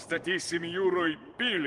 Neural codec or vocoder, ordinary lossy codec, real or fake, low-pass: vocoder, 24 kHz, 100 mel bands, Vocos; Opus, 24 kbps; fake; 10.8 kHz